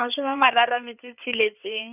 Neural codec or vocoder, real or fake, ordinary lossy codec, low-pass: codec, 16 kHz in and 24 kHz out, 2.2 kbps, FireRedTTS-2 codec; fake; none; 3.6 kHz